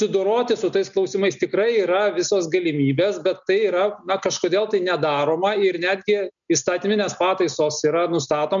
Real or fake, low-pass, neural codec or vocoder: real; 7.2 kHz; none